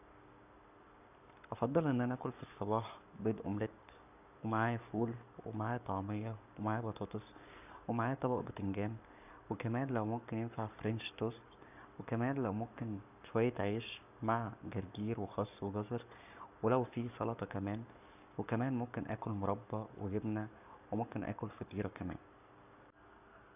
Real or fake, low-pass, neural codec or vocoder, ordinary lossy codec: fake; 3.6 kHz; codec, 44.1 kHz, 7.8 kbps, Pupu-Codec; none